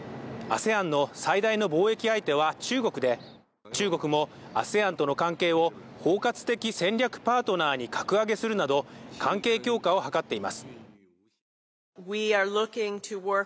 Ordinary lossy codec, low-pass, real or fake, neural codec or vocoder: none; none; real; none